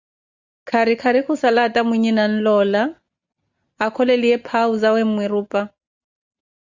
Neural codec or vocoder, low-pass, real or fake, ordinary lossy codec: none; 7.2 kHz; real; Opus, 64 kbps